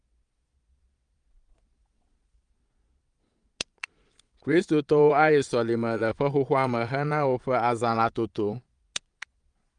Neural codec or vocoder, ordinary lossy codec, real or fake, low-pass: vocoder, 22.05 kHz, 80 mel bands, Vocos; Opus, 32 kbps; fake; 9.9 kHz